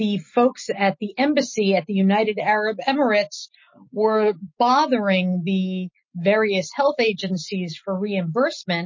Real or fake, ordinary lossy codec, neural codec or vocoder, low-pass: real; MP3, 32 kbps; none; 7.2 kHz